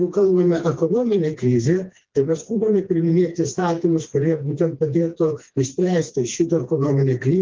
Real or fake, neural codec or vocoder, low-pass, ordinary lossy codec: fake; codec, 16 kHz, 2 kbps, FreqCodec, smaller model; 7.2 kHz; Opus, 32 kbps